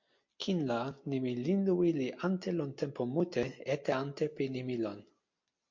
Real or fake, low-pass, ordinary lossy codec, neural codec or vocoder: real; 7.2 kHz; MP3, 48 kbps; none